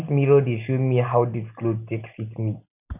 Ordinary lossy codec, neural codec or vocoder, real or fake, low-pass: none; none; real; 3.6 kHz